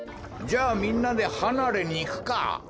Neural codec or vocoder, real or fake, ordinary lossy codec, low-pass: none; real; none; none